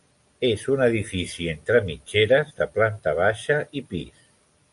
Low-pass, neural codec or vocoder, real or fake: 10.8 kHz; none; real